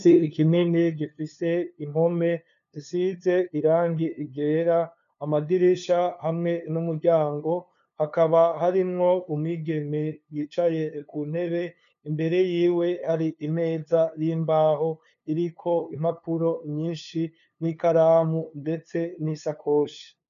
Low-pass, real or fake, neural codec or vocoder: 7.2 kHz; fake; codec, 16 kHz, 2 kbps, FunCodec, trained on LibriTTS, 25 frames a second